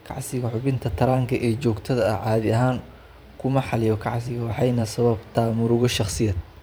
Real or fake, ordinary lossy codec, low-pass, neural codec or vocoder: fake; none; none; vocoder, 44.1 kHz, 128 mel bands every 512 samples, BigVGAN v2